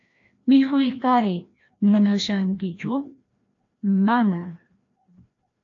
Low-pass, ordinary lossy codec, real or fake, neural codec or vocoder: 7.2 kHz; AAC, 48 kbps; fake; codec, 16 kHz, 1 kbps, FreqCodec, larger model